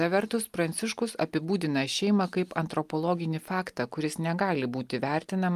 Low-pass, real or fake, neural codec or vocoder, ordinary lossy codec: 14.4 kHz; real; none; Opus, 32 kbps